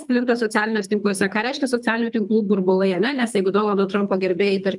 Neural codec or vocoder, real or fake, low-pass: codec, 24 kHz, 3 kbps, HILCodec; fake; 10.8 kHz